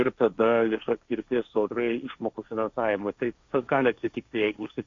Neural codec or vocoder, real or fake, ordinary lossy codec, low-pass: codec, 16 kHz, 1.1 kbps, Voila-Tokenizer; fake; AAC, 64 kbps; 7.2 kHz